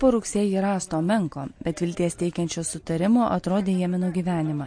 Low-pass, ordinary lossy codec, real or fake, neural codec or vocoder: 9.9 kHz; MP3, 48 kbps; real; none